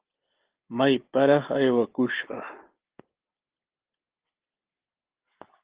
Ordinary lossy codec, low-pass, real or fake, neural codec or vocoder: Opus, 16 kbps; 3.6 kHz; real; none